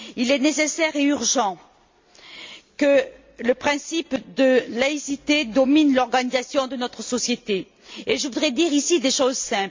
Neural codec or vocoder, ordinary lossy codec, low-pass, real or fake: none; MP3, 48 kbps; 7.2 kHz; real